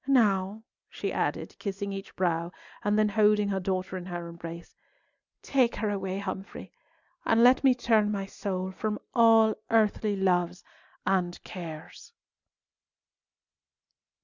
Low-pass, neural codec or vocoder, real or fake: 7.2 kHz; none; real